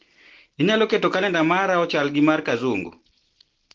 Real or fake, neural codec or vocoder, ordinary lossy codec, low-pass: real; none; Opus, 16 kbps; 7.2 kHz